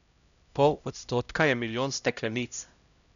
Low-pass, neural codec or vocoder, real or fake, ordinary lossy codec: 7.2 kHz; codec, 16 kHz, 0.5 kbps, X-Codec, HuBERT features, trained on LibriSpeech; fake; none